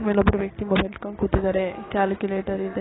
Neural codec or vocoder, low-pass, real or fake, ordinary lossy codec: codec, 16 kHz, 6 kbps, DAC; 7.2 kHz; fake; AAC, 16 kbps